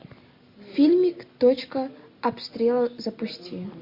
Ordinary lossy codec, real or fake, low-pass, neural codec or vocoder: MP3, 32 kbps; real; 5.4 kHz; none